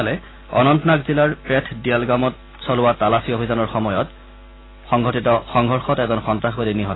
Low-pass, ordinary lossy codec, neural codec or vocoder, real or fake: 7.2 kHz; AAC, 16 kbps; none; real